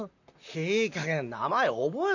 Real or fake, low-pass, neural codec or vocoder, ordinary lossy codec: fake; 7.2 kHz; vocoder, 44.1 kHz, 128 mel bands, Pupu-Vocoder; none